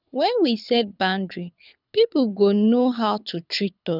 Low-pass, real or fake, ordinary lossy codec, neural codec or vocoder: 5.4 kHz; fake; none; codec, 24 kHz, 6 kbps, HILCodec